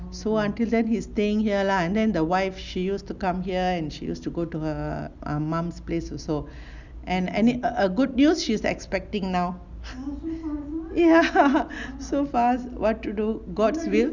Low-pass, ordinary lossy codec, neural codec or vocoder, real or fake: 7.2 kHz; Opus, 64 kbps; none; real